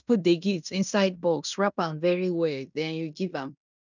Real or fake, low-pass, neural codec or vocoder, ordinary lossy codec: fake; 7.2 kHz; codec, 16 kHz in and 24 kHz out, 0.9 kbps, LongCat-Audio-Codec, fine tuned four codebook decoder; none